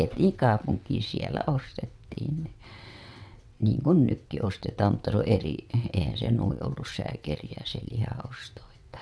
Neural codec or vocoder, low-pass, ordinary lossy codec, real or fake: vocoder, 22.05 kHz, 80 mel bands, WaveNeXt; none; none; fake